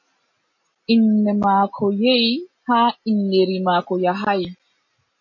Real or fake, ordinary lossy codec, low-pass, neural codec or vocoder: real; MP3, 32 kbps; 7.2 kHz; none